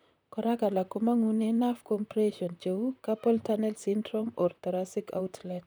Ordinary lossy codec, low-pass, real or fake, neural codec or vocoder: none; none; fake; vocoder, 44.1 kHz, 128 mel bands, Pupu-Vocoder